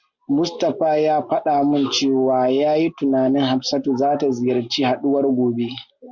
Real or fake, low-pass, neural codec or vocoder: real; 7.2 kHz; none